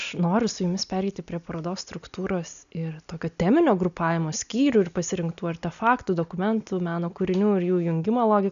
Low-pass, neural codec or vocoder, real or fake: 7.2 kHz; none; real